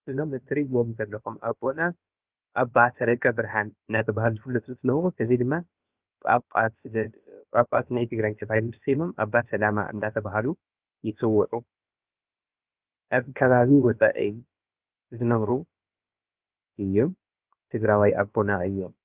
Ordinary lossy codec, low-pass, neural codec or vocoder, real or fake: Opus, 32 kbps; 3.6 kHz; codec, 16 kHz, about 1 kbps, DyCAST, with the encoder's durations; fake